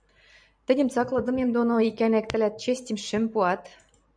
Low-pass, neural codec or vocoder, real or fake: 9.9 kHz; vocoder, 44.1 kHz, 128 mel bands every 512 samples, BigVGAN v2; fake